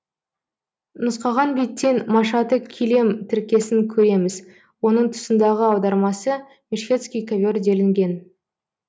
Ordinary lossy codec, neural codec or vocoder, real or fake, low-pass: none; none; real; none